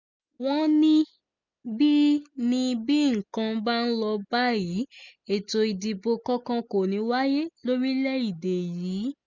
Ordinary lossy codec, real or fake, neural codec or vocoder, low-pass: none; real; none; 7.2 kHz